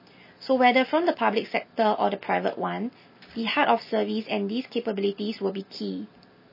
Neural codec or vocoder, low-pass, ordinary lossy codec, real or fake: none; 5.4 kHz; MP3, 24 kbps; real